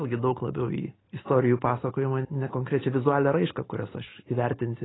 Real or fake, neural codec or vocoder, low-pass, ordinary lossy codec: real; none; 7.2 kHz; AAC, 16 kbps